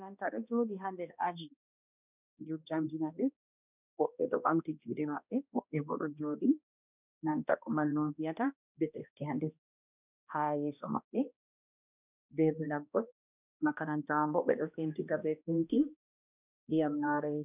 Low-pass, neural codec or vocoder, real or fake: 3.6 kHz; codec, 16 kHz, 1 kbps, X-Codec, HuBERT features, trained on balanced general audio; fake